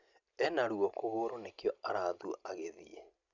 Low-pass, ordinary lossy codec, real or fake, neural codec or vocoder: 7.2 kHz; none; real; none